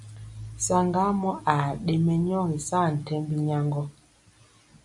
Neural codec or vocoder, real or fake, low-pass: none; real; 10.8 kHz